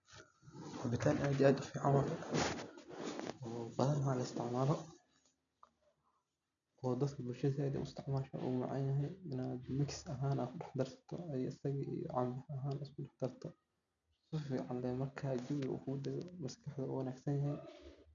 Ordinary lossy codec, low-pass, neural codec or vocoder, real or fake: none; 7.2 kHz; none; real